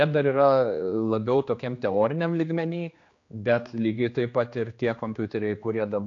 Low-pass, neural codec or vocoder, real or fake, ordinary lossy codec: 7.2 kHz; codec, 16 kHz, 2 kbps, X-Codec, HuBERT features, trained on general audio; fake; AAC, 48 kbps